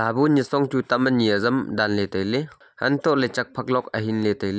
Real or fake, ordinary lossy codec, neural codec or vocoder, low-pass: real; none; none; none